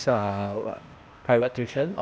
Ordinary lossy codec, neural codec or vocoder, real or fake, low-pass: none; codec, 16 kHz, 0.8 kbps, ZipCodec; fake; none